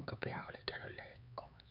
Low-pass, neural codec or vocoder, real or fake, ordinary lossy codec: 5.4 kHz; codec, 16 kHz, 4 kbps, X-Codec, HuBERT features, trained on LibriSpeech; fake; none